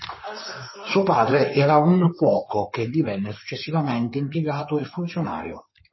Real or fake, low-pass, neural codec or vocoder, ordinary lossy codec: fake; 7.2 kHz; codec, 16 kHz in and 24 kHz out, 2.2 kbps, FireRedTTS-2 codec; MP3, 24 kbps